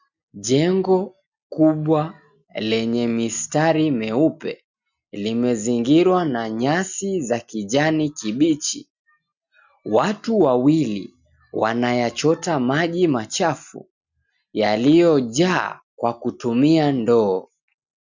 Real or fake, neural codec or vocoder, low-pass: real; none; 7.2 kHz